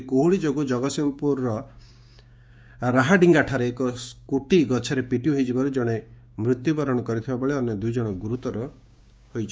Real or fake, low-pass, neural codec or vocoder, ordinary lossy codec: fake; none; codec, 16 kHz, 6 kbps, DAC; none